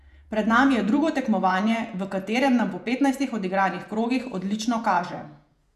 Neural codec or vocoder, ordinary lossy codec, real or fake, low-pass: vocoder, 48 kHz, 128 mel bands, Vocos; none; fake; 14.4 kHz